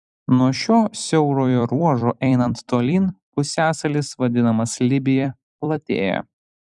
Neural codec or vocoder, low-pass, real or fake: vocoder, 44.1 kHz, 128 mel bands every 256 samples, BigVGAN v2; 10.8 kHz; fake